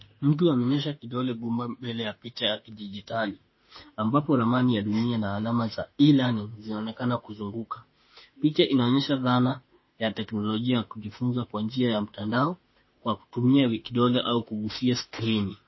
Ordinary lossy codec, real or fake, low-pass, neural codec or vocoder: MP3, 24 kbps; fake; 7.2 kHz; autoencoder, 48 kHz, 32 numbers a frame, DAC-VAE, trained on Japanese speech